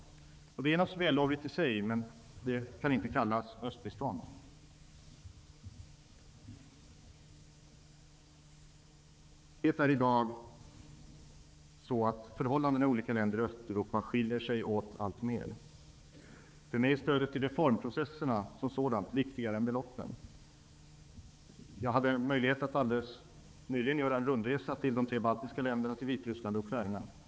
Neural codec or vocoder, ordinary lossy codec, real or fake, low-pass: codec, 16 kHz, 4 kbps, X-Codec, HuBERT features, trained on balanced general audio; none; fake; none